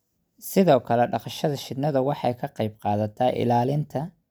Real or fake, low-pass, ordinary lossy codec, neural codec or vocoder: real; none; none; none